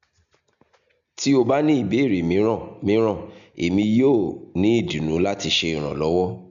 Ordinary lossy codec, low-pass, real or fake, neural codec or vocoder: none; 7.2 kHz; real; none